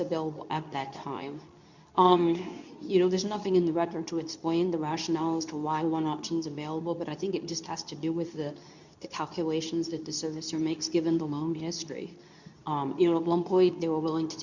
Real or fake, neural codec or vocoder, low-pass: fake; codec, 24 kHz, 0.9 kbps, WavTokenizer, medium speech release version 2; 7.2 kHz